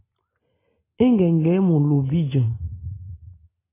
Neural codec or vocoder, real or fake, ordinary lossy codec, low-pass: none; real; AAC, 16 kbps; 3.6 kHz